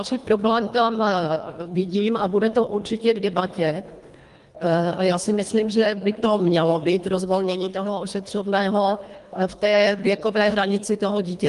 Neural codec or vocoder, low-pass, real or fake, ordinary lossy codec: codec, 24 kHz, 1.5 kbps, HILCodec; 10.8 kHz; fake; Opus, 32 kbps